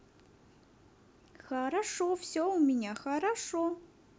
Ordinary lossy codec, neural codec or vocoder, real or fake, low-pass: none; none; real; none